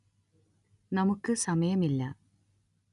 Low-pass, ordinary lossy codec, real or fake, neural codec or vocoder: 10.8 kHz; none; real; none